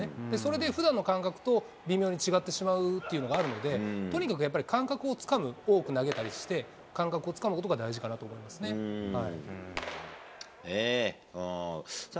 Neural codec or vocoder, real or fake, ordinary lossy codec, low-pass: none; real; none; none